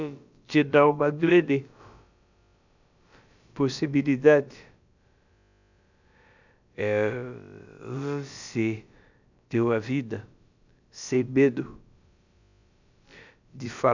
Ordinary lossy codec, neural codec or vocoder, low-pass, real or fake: none; codec, 16 kHz, about 1 kbps, DyCAST, with the encoder's durations; 7.2 kHz; fake